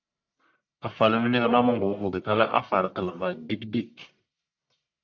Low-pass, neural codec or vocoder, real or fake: 7.2 kHz; codec, 44.1 kHz, 1.7 kbps, Pupu-Codec; fake